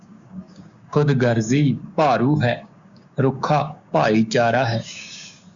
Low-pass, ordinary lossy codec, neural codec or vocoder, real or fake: 7.2 kHz; Opus, 64 kbps; codec, 16 kHz, 6 kbps, DAC; fake